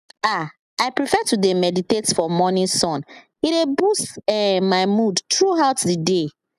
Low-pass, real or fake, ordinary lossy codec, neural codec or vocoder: 14.4 kHz; real; none; none